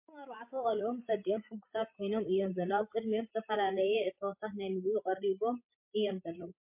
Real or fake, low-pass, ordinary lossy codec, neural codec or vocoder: fake; 3.6 kHz; MP3, 32 kbps; codec, 16 kHz, 16 kbps, FreqCodec, larger model